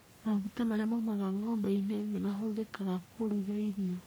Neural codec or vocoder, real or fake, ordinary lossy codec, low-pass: codec, 44.1 kHz, 3.4 kbps, Pupu-Codec; fake; none; none